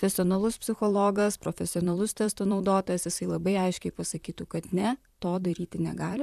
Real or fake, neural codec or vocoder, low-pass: fake; vocoder, 44.1 kHz, 128 mel bands, Pupu-Vocoder; 14.4 kHz